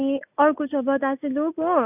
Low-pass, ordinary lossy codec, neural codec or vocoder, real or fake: 3.6 kHz; none; none; real